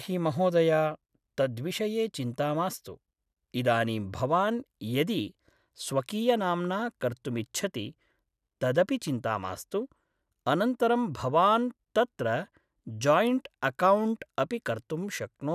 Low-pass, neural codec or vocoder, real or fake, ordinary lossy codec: 14.4 kHz; none; real; none